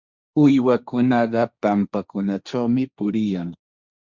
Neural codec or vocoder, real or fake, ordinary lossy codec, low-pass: codec, 16 kHz, 1.1 kbps, Voila-Tokenizer; fake; Opus, 64 kbps; 7.2 kHz